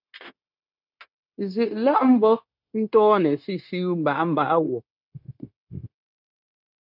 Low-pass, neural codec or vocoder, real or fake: 5.4 kHz; codec, 16 kHz, 0.9 kbps, LongCat-Audio-Codec; fake